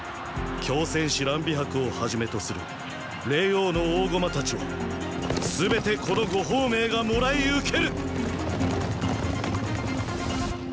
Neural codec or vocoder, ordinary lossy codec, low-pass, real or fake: none; none; none; real